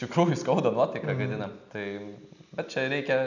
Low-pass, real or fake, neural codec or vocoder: 7.2 kHz; real; none